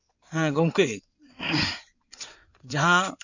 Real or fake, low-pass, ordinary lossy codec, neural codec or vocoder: fake; 7.2 kHz; none; codec, 16 kHz in and 24 kHz out, 2.2 kbps, FireRedTTS-2 codec